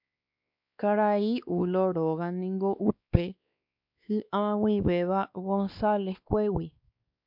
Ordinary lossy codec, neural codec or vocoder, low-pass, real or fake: MP3, 48 kbps; codec, 16 kHz, 2 kbps, X-Codec, WavLM features, trained on Multilingual LibriSpeech; 5.4 kHz; fake